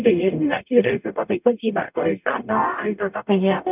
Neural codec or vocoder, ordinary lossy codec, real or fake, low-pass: codec, 44.1 kHz, 0.9 kbps, DAC; none; fake; 3.6 kHz